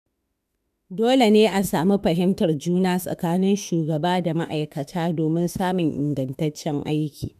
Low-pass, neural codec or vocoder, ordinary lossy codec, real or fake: 14.4 kHz; autoencoder, 48 kHz, 32 numbers a frame, DAC-VAE, trained on Japanese speech; none; fake